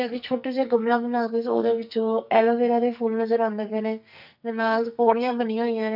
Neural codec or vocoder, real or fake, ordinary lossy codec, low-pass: codec, 44.1 kHz, 2.6 kbps, SNAC; fake; none; 5.4 kHz